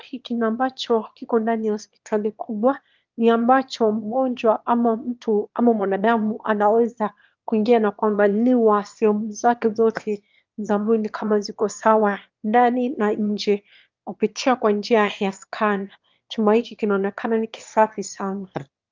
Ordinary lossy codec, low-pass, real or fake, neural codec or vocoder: Opus, 24 kbps; 7.2 kHz; fake; autoencoder, 22.05 kHz, a latent of 192 numbers a frame, VITS, trained on one speaker